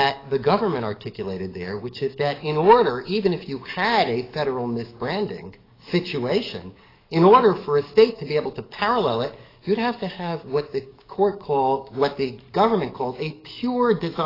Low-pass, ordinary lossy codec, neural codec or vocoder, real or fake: 5.4 kHz; AAC, 24 kbps; codec, 44.1 kHz, 7.8 kbps, DAC; fake